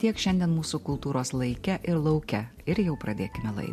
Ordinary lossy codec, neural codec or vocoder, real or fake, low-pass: MP3, 64 kbps; none; real; 14.4 kHz